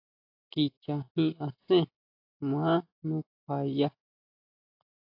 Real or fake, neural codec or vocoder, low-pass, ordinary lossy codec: real; none; 5.4 kHz; AAC, 48 kbps